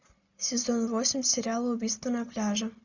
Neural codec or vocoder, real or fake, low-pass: none; real; 7.2 kHz